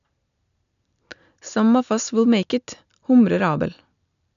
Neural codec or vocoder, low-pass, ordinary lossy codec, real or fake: none; 7.2 kHz; none; real